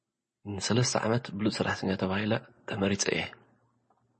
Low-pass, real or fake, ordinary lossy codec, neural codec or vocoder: 10.8 kHz; real; MP3, 32 kbps; none